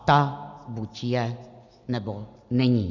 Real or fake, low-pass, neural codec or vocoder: real; 7.2 kHz; none